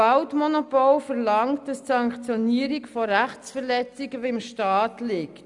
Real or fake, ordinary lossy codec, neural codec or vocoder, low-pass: real; none; none; 10.8 kHz